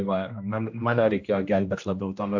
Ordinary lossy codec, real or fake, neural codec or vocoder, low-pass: AAC, 48 kbps; fake; codec, 16 kHz, 1 kbps, X-Codec, HuBERT features, trained on general audio; 7.2 kHz